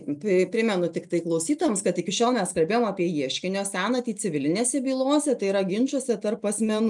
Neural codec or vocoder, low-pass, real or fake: none; 10.8 kHz; real